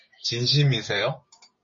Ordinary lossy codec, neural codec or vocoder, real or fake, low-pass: MP3, 32 kbps; codec, 16 kHz, 6 kbps, DAC; fake; 7.2 kHz